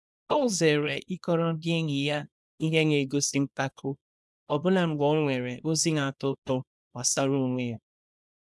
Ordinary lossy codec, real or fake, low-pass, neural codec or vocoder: none; fake; none; codec, 24 kHz, 0.9 kbps, WavTokenizer, small release